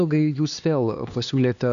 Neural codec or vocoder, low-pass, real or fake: codec, 16 kHz, 2 kbps, X-Codec, HuBERT features, trained on LibriSpeech; 7.2 kHz; fake